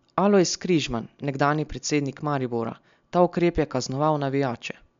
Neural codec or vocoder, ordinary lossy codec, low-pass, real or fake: none; MP3, 64 kbps; 7.2 kHz; real